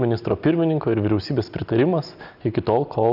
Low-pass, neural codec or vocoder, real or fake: 5.4 kHz; none; real